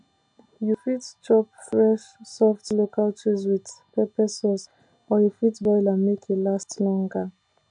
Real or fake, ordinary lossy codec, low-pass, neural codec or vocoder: real; MP3, 64 kbps; 9.9 kHz; none